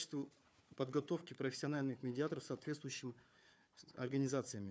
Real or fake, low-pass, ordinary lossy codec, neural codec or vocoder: fake; none; none; codec, 16 kHz, 4 kbps, FunCodec, trained on Chinese and English, 50 frames a second